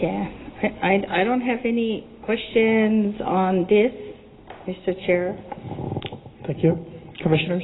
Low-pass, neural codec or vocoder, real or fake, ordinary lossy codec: 7.2 kHz; none; real; AAC, 16 kbps